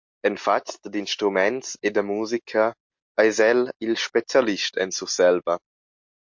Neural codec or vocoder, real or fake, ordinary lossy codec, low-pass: none; real; MP3, 48 kbps; 7.2 kHz